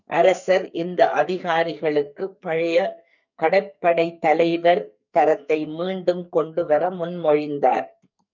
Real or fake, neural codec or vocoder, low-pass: fake; codec, 44.1 kHz, 2.6 kbps, SNAC; 7.2 kHz